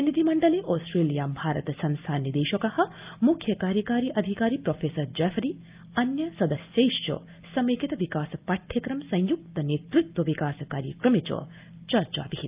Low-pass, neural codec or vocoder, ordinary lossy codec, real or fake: 3.6 kHz; none; Opus, 24 kbps; real